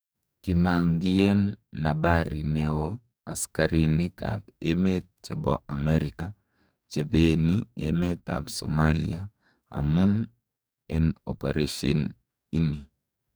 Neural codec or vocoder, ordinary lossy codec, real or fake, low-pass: codec, 44.1 kHz, 2.6 kbps, DAC; none; fake; none